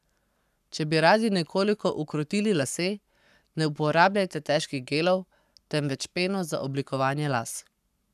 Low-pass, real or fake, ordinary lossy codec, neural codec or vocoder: 14.4 kHz; fake; none; codec, 44.1 kHz, 7.8 kbps, Pupu-Codec